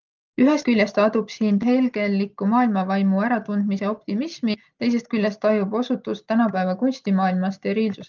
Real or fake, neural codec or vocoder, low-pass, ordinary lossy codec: real; none; 7.2 kHz; Opus, 24 kbps